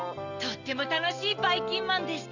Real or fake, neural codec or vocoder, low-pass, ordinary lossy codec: real; none; 7.2 kHz; none